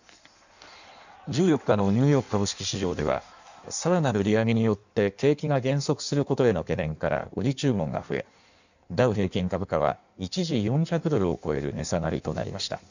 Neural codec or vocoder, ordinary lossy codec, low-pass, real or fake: codec, 16 kHz in and 24 kHz out, 1.1 kbps, FireRedTTS-2 codec; none; 7.2 kHz; fake